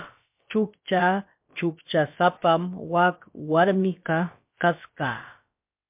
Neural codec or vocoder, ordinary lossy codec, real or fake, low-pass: codec, 16 kHz, about 1 kbps, DyCAST, with the encoder's durations; MP3, 32 kbps; fake; 3.6 kHz